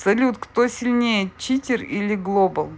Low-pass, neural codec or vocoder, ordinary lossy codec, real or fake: none; none; none; real